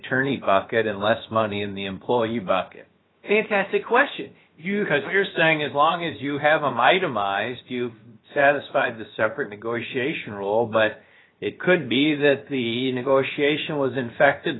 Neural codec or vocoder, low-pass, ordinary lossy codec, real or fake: codec, 16 kHz, about 1 kbps, DyCAST, with the encoder's durations; 7.2 kHz; AAC, 16 kbps; fake